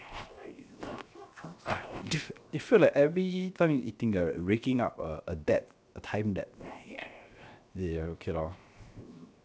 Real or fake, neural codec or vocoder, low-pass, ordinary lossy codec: fake; codec, 16 kHz, 0.7 kbps, FocalCodec; none; none